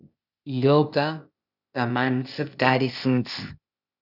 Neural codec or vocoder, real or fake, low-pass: codec, 16 kHz, 0.8 kbps, ZipCodec; fake; 5.4 kHz